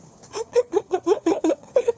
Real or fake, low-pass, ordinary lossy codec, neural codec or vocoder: fake; none; none; codec, 16 kHz, 4 kbps, FunCodec, trained on LibriTTS, 50 frames a second